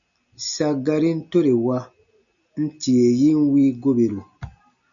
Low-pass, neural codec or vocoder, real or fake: 7.2 kHz; none; real